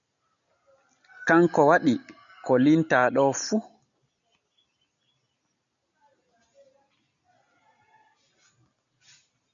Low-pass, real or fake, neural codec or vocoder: 7.2 kHz; real; none